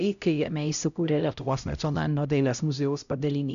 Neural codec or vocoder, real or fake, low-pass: codec, 16 kHz, 0.5 kbps, X-Codec, HuBERT features, trained on LibriSpeech; fake; 7.2 kHz